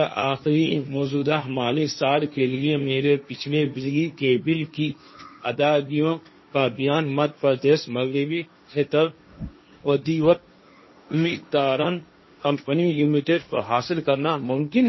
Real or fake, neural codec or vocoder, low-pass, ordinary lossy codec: fake; codec, 16 kHz, 1.1 kbps, Voila-Tokenizer; 7.2 kHz; MP3, 24 kbps